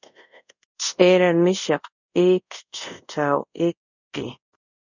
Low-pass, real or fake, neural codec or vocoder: 7.2 kHz; fake; codec, 24 kHz, 0.5 kbps, DualCodec